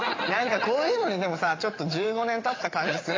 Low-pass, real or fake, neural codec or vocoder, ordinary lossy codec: 7.2 kHz; fake; vocoder, 22.05 kHz, 80 mel bands, WaveNeXt; AAC, 32 kbps